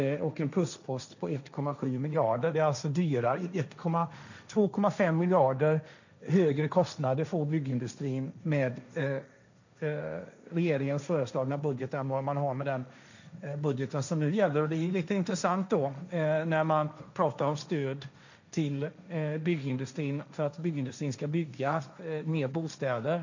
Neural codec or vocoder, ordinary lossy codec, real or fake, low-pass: codec, 16 kHz, 1.1 kbps, Voila-Tokenizer; none; fake; 7.2 kHz